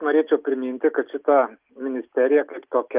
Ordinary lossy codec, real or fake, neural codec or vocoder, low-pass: Opus, 24 kbps; real; none; 3.6 kHz